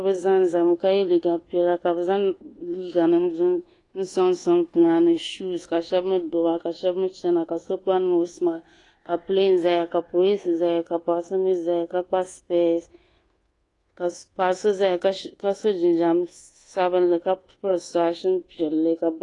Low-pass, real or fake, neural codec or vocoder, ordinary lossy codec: 10.8 kHz; fake; codec, 24 kHz, 1.2 kbps, DualCodec; AAC, 32 kbps